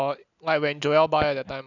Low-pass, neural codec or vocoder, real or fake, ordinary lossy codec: 7.2 kHz; none; real; none